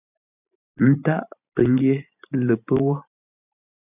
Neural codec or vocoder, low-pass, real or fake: vocoder, 44.1 kHz, 128 mel bands every 512 samples, BigVGAN v2; 3.6 kHz; fake